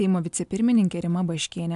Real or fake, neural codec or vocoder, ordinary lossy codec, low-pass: real; none; AAC, 96 kbps; 10.8 kHz